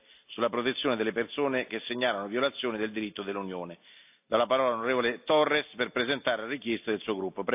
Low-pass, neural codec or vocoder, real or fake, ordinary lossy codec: 3.6 kHz; none; real; AAC, 32 kbps